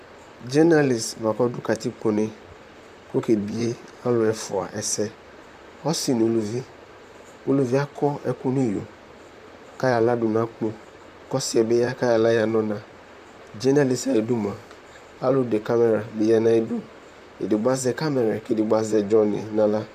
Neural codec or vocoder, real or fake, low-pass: vocoder, 44.1 kHz, 128 mel bands, Pupu-Vocoder; fake; 14.4 kHz